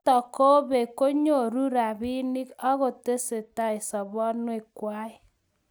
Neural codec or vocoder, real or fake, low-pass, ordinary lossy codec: none; real; none; none